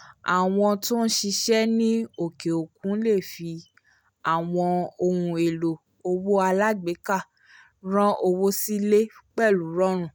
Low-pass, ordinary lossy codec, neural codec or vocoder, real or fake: 19.8 kHz; none; none; real